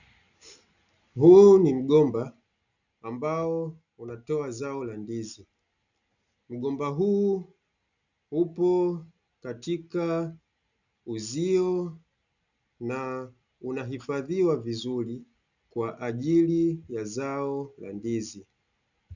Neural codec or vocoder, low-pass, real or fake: none; 7.2 kHz; real